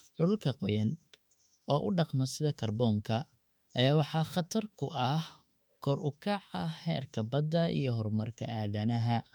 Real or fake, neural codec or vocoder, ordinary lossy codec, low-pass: fake; autoencoder, 48 kHz, 32 numbers a frame, DAC-VAE, trained on Japanese speech; MP3, 96 kbps; 19.8 kHz